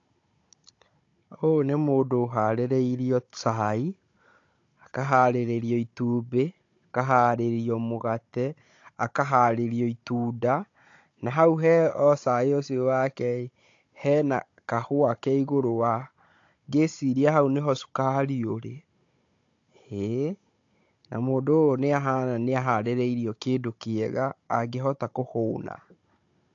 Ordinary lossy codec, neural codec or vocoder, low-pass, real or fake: AAC, 48 kbps; codec, 16 kHz, 16 kbps, FunCodec, trained on Chinese and English, 50 frames a second; 7.2 kHz; fake